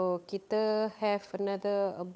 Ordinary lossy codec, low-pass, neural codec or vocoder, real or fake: none; none; codec, 16 kHz, 8 kbps, FunCodec, trained on Chinese and English, 25 frames a second; fake